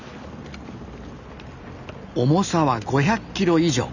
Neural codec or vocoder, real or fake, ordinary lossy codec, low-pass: none; real; none; 7.2 kHz